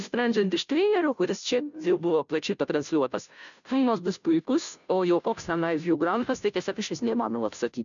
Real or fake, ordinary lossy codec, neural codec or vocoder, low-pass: fake; MP3, 96 kbps; codec, 16 kHz, 0.5 kbps, FunCodec, trained on Chinese and English, 25 frames a second; 7.2 kHz